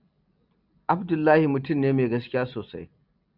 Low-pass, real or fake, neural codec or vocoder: 5.4 kHz; real; none